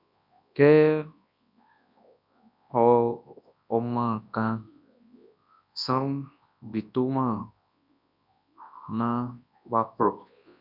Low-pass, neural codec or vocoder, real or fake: 5.4 kHz; codec, 24 kHz, 0.9 kbps, WavTokenizer, large speech release; fake